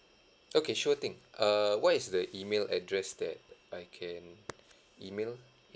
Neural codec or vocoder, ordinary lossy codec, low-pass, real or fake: none; none; none; real